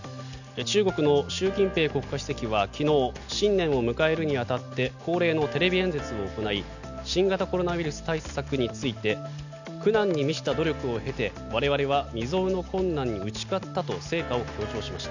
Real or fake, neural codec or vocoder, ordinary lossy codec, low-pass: real; none; none; 7.2 kHz